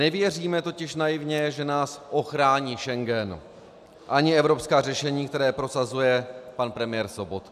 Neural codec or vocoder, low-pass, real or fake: none; 14.4 kHz; real